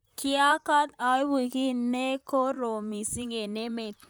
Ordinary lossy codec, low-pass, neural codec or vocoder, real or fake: none; none; vocoder, 44.1 kHz, 128 mel bands, Pupu-Vocoder; fake